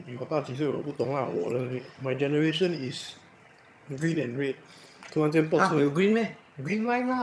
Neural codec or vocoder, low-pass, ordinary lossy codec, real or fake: vocoder, 22.05 kHz, 80 mel bands, HiFi-GAN; none; none; fake